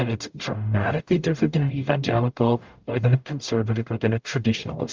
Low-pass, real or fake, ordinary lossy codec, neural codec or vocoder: 7.2 kHz; fake; Opus, 32 kbps; codec, 44.1 kHz, 0.9 kbps, DAC